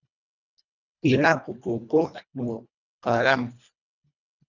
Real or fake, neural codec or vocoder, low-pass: fake; codec, 24 kHz, 1.5 kbps, HILCodec; 7.2 kHz